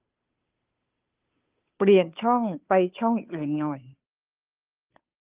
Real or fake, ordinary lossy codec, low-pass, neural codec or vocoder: fake; Opus, 32 kbps; 3.6 kHz; codec, 16 kHz, 2 kbps, FunCodec, trained on Chinese and English, 25 frames a second